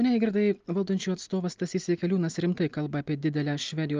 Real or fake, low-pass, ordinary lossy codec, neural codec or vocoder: real; 7.2 kHz; Opus, 24 kbps; none